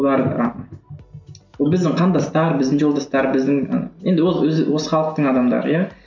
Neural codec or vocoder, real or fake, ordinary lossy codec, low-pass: none; real; none; 7.2 kHz